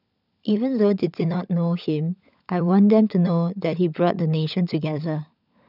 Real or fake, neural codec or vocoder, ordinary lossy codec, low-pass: fake; codec, 16 kHz, 16 kbps, FunCodec, trained on LibriTTS, 50 frames a second; none; 5.4 kHz